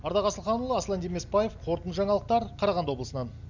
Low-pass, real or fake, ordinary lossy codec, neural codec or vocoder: 7.2 kHz; real; none; none